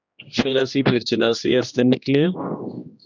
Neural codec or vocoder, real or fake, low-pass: codec, 16 kHz, 1 kbps, X-Codec, HuBERT features, trained on general audio; fake; 7.2 kHz